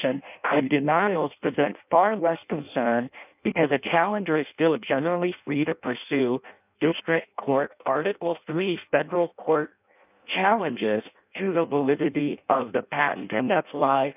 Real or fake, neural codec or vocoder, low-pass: fake; codec, 16 kHz in and 24 kHz out, 0.6 kbps, FireRedTTS-2 codec; 3.6 kHz